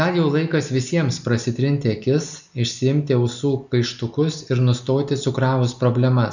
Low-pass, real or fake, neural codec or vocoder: 7.2 kHz; real; none